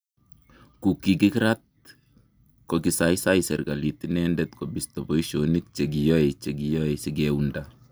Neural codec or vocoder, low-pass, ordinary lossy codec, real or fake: none; none; none; real